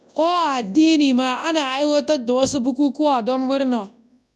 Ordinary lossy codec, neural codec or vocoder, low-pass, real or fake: none; codec, 24 kHz, 0.9 kbps, WavTokenizer, large speech release; none; fake